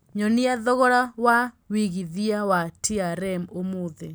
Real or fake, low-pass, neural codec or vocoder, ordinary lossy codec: real; none; none; none